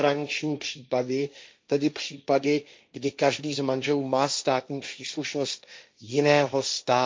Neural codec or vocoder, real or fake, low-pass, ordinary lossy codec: codec, 16 kHz, 1.1 kbps, Voila-Tokenizer; fake; none; none